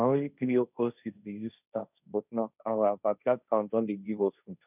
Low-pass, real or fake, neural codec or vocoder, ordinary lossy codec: 3.6 kHz; fake; codec, 16 kHz, 1.1 kbps, Voila-Tokenizer; none